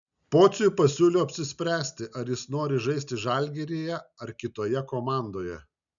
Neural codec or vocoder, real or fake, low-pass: none; real; 7.2 kHz